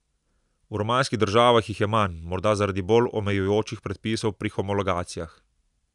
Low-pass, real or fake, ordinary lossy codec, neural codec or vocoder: 10.8 kHz; real; none; none